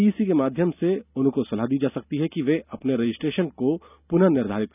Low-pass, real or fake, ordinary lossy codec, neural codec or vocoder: 3.6 kHz; real; none; none